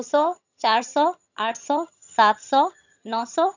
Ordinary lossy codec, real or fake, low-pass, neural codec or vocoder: none; fake; 7.2 kHz; vocoder, 22.05 kHz, 80 mel bands, HiFi-GAN